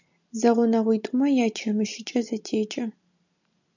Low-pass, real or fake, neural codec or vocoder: 7.2 kHz; real; none